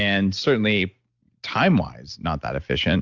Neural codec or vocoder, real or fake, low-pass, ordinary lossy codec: none; real; 7.2 kHz; Opus, 64 kbps